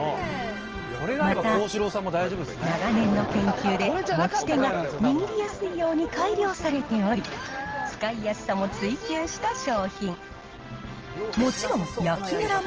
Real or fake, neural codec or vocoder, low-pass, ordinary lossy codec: real; none; 7.2 kHz; Opus, 16 kbps